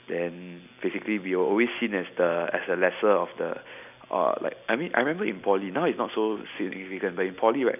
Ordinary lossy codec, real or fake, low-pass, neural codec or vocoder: none; real; 3.6 kHz; none